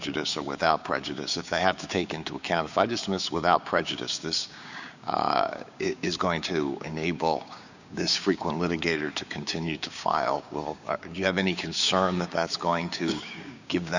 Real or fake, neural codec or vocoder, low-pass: fake; codec, 16 kHz, 6 kbps, DAC; 7.2 kHz